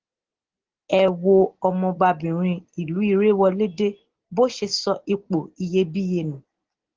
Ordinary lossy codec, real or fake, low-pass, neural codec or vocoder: Opus, 16 kbps; real; 7.2 kHz; none